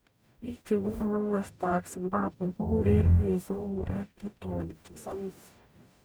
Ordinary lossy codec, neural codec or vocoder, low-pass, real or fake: none; codec, 44.1 kHz, 0.9 kbps, DAC; none; fake